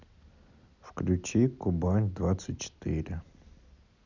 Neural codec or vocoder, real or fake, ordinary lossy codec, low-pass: none; real; none; 7.2 kHz